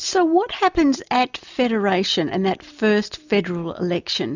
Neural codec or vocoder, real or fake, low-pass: none; real; 7.2 kHz